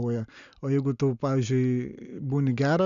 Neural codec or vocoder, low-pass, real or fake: none; 7.2 kHz; real